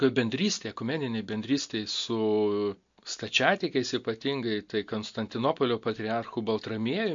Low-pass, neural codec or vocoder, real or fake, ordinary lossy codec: 7.2 kHz; none; real; MP3, 48 kbps